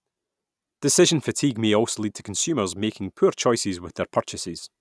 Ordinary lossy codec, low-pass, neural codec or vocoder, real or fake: none; none; none; real